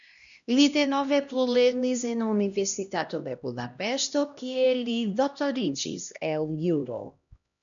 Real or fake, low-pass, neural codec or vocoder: fake; 7.2 kHz; codec, 16 kHz, 1 kbps, X-Codec, HuBERT features, trained on LibriSpeech